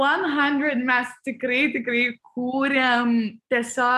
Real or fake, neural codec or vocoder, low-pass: real; none; 14.4 kHz